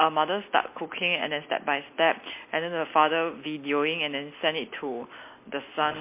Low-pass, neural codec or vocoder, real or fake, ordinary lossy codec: 3.6 kHz; none; real; MP3, 24 kbps